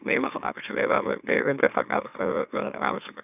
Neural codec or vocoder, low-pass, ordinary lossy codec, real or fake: autoencoder, 44.1 kHz, a latent of 192 numbers a frame, MeloTTS; 3.6 kHz; none; fake